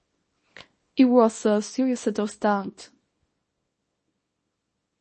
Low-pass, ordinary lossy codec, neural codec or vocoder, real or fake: 10.8 kHz; MP3, 32 kbps; codec, 24 kHz, 0.9 kbps, WavTokenizer, small release; fake